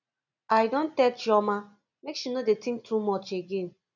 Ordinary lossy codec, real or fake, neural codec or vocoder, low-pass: none; real; none; 7.2 kHz